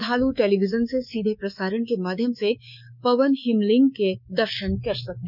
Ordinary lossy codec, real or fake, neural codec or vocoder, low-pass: none; fake; codec, 24 kHz, 3.1 kbps, DualCodec; 5.4 kHz